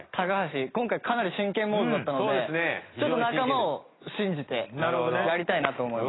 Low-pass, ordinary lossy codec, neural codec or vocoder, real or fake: 7.2 kHz; AAC, 16 kbps; none; real